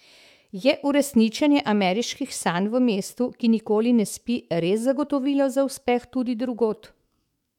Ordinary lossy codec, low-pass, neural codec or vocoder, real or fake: MP3, 96 kbps; 19.8 kHz; autoencoder, 48 kHz, 128 numbers a frame, DAC-VAE, trained on Japanese speech; fake